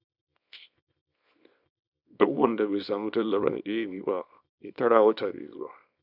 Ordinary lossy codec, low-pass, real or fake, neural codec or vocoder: none; 5.4 kHz; fake; codec, 24 kHz, 0.9 kbps, WavTokenizer, small release